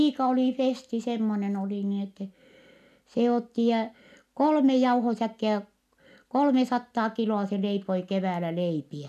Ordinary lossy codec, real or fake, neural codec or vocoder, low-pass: none; real; none; 14.4 kHz